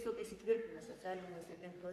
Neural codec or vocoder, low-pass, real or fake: codec, 44.1 kHz, 3.4 kbps, Pupu-Codec; 14.4 kHz; fake